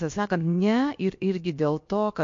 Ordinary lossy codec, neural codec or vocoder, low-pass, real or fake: MP3, 48 kbps; codec, 16 kHz, about 1 kbps, DyCAST, with the encoder's durations; 7.2 kHz; fake